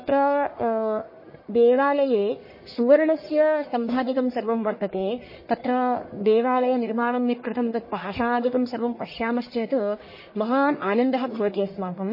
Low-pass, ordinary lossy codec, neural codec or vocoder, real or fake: 5.4 kHz; MP3, 24 kbps; codec, 44.1 kHz, 1.7 kbps, Pupu-Codec; fake